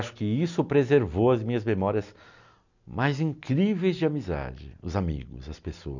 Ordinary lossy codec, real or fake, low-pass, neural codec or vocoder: none; real; 7.2 kHz; none